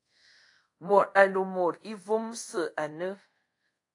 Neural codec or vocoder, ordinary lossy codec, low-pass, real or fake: codec, 24 kHz, 0.5 kbps, DualCodec; AAC, 48 kbps; 10.8 kHz; fake